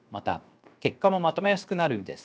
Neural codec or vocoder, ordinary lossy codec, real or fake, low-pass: codec, 16 kHz, 0.7 kbps, FocalCodec; none; fake; none